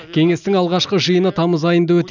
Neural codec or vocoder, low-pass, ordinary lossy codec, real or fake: none; 7.2 kHz; none; real